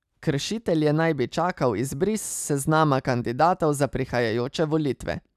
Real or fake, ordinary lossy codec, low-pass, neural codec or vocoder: real; none; 14.4 kHz; none